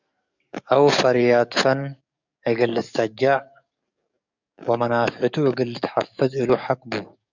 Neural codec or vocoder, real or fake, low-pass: codec, 44.1 kHz, 7.8 kbps, DAC; fake; 7.2 kHz